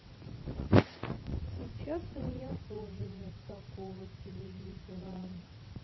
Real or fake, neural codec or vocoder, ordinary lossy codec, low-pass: fake; vocoder, 22.05 kHz, 80 mel bands, WaveNeXt; MP3, 24 kbps; 7.2 kHz